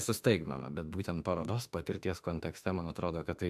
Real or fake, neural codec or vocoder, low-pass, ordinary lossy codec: fake; autoencoder, 48 kHz, 32 numbers a frame, DAC-VAE, trained on Japanese speech; 14.4 kHz; AAC, 96 kbps